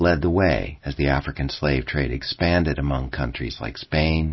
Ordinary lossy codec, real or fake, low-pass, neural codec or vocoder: MP3, 24 kbps; real; 7.2 kHz; none